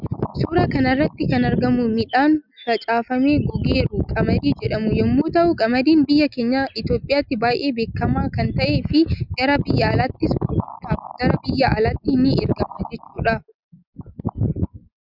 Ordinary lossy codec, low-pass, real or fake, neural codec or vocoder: Opus, 64 kbps; 5.4 kHz; real; none